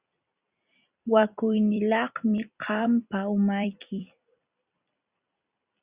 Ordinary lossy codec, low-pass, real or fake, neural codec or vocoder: Opus, 64 kbps; 3.6 kHz; fake; vocoder, 44.1 kHz, 128 mel bands every 256 samples, BigVGAN v2